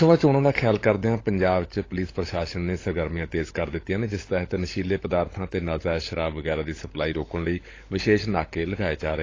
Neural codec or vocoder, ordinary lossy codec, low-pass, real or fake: codec, 16 kHz, 16 kbps, FunCodec, trained on LibriTTS, 50 frames a second; AAC, 32 kbps; 7.2 kHz; fake